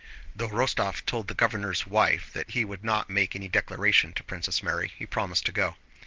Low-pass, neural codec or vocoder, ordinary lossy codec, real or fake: 7.2 kHz; none; Opus, 16 kbps; real